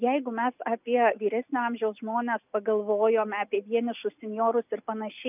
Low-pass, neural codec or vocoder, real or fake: 3.6 kHz; none; real